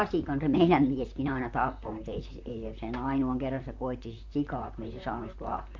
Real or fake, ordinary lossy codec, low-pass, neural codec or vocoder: fake; MP3, 48 kbps; 7.2 kHz; vocoder, 44.1 kHz, 128 mel bands, Pupu-Vocoder